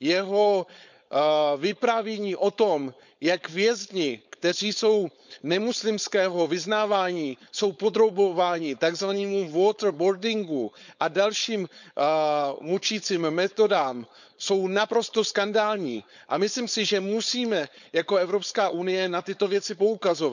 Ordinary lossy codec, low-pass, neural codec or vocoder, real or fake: none; 7.2 kHz; codec, 16 kHz, 4.8 kbps, FACodec; fake